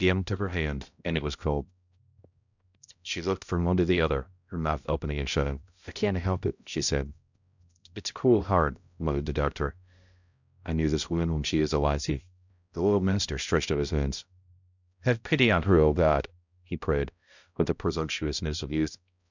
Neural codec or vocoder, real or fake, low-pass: codec, 16 kHz, 0.5 kbps, X-Codec, HuBERT features, trained on balanced general audio; fake; 7.2 kHz